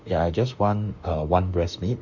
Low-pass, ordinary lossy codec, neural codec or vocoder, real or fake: 7.2 kHz; none; autoencoder, 48 kHz, 32 numbers a frame, DAC-VAE, trained on Japanese speech; fake